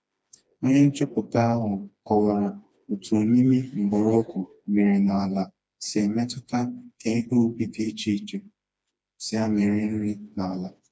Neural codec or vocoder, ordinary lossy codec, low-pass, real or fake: codec, 16 kHz, 2 kbps, FreqCodec, smaller model; none; none; fake